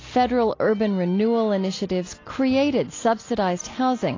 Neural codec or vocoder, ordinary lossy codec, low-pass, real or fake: none; AAC, 32 kbps; 7.2 kHz; real